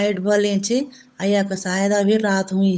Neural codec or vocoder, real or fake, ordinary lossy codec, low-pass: codec, 16 kHz, 8 kbps, FunCodec, trained on Chinese and English, 25 frames a second; fake; none; none